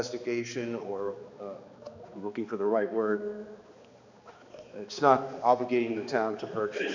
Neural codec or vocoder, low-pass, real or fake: codec, 16 kHz, 2 kbps, X-Codec, HuBERT features, trained on balanced general audio; 7.2 kHz; fake